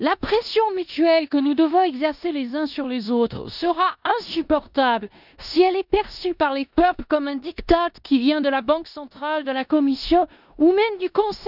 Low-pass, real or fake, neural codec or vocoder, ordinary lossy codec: 5.4 kHz; fake; codec, 16 kHz in and 24 kHz out, 0.9 kbps, LongCat-Audio-Codec, four codebook decoder; none